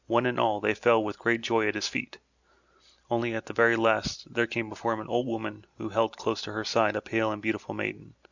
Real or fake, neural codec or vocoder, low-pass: real; none; 7.2 kHz